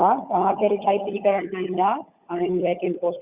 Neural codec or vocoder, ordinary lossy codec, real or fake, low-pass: codec, 16 kHz, 16 kbps, FunCodec, trained on LibriTTS, 50 frames a second; Opus, 64 kbps; fake; 3.6 kHz